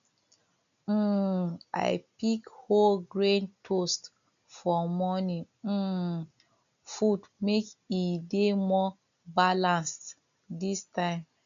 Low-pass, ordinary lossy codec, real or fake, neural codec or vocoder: 7.2 kHz; none; real; none